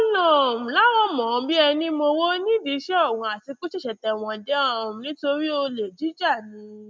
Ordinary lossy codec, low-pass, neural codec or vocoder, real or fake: none; none; none; real